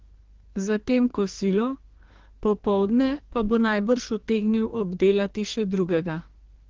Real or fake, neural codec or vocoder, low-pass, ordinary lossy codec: fake; codec, 44.1 kHz, 2.6 kbps, SNAC; 7.2 kHz; Opus, 16 kbps